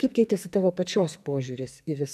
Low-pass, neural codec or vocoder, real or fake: 14.4 kHz; codec, 44.1 kHz, 2.6 kbps, SNAC; fake